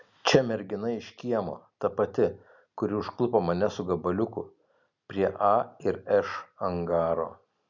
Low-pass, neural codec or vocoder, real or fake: 7.2 kHz; none; real